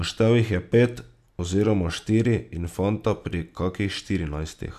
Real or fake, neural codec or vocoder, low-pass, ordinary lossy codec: real; none; 14.4 kHz; none